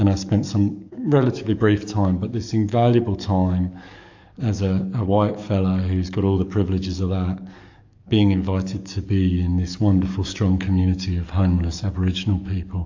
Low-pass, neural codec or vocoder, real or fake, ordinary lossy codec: 7.2 kHz; codec, 44.1 kHz, 7.8 kbps, DAC; fake; AAC, 48 kbps